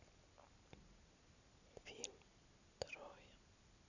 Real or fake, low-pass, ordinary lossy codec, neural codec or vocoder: real; 7.2 kHz; none; none